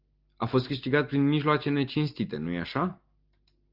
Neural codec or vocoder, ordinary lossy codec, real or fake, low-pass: none; Opus, 32 kbps; real; 5.4 kHz